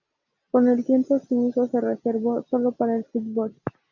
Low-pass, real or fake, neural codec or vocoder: 7.2 kHz; real; none